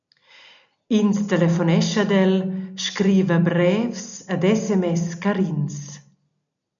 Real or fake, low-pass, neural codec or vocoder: real; 7.2 kHz; none